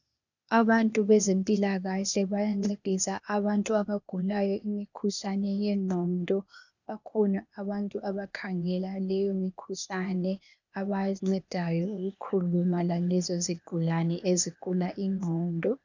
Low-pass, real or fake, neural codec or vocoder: 7.2 kHz; fake; codec, 16 kHz, 0.8 kbps, ZipCodec